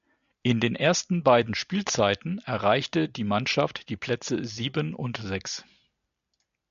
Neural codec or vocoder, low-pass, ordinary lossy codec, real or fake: none; 7.2 kHz; AAC, 96 kbps; real